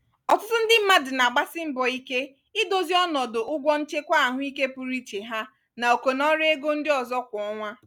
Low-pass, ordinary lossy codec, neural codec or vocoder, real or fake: none; none; none; real